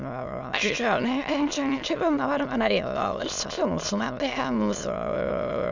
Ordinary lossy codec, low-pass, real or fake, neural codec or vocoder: none; 7.2 kHz; fake; autoencoder, 22.05 kHz, a latent of 192 numbers a frame, VITS, trained on many speakers